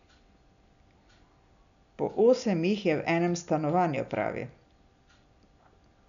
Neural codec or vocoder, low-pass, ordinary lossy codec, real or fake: none; 7.2 kHz; none; real